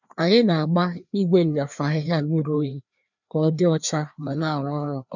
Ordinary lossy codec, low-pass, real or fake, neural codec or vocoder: none; 7.2 kHz; fake; codec, 16 kHz, 2 kbps, FreqCodec, larger model